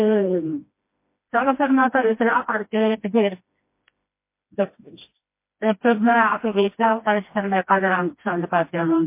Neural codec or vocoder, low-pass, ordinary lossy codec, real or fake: codec, 16 kHz, 1 kbps, FreqCodec, smaller model; 3.6 kHz; MP3, 24 kbps; fake